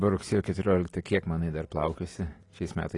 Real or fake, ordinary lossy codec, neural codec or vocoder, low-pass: real; AAC, 32 kbps; none; 10.8 kHz